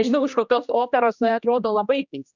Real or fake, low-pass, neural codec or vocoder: fake; 7.2 kHz; codec, 16 kHz, 1 kbps, X-Codec, HuBERT features, trained on general audio